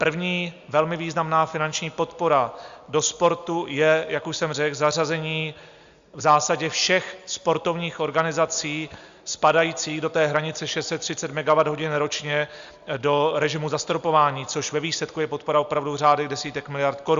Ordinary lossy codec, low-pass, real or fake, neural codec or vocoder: Opus, 64 kbps; 7.2 kHz; real; none